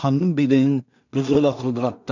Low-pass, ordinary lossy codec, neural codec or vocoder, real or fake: 7.2 kHz; none; codec, 16 kHz in and 24 kHz out, 0.4 kbps, LongCat-Audio-Codec, two codebook decoder; fake